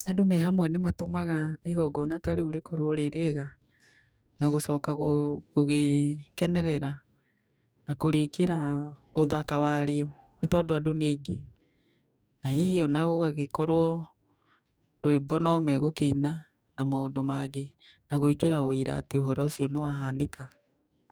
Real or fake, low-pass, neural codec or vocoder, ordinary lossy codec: fake; none; codec, 44.1 kHz, 2.6 kbps, DAC; none